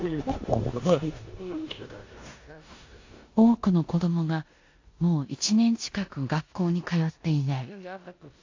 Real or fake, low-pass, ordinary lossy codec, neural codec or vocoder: fake; 7.2 kHz; AAC, 48 kbps; codec, 16 kHz in and 24 kHz out, 0.9 kbps, LongCat-Audio-Codec, four codebook decoder